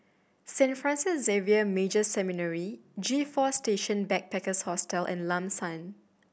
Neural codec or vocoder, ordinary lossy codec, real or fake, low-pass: none; none; real; none